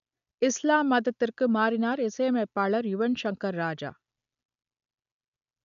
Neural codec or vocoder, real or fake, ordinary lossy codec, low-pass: none; real; none; 7.2 kHz